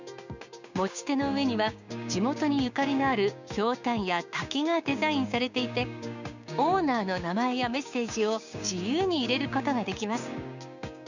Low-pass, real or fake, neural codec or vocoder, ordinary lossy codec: 7.2 kHz; fake; codec, 16 kHz, 6 kbps, DAC; none